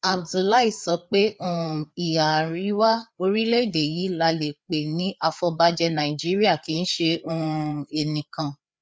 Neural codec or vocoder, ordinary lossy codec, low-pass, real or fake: codec, 16 kHz, 4 kbps, FreqCodec, larger model; none; none; fake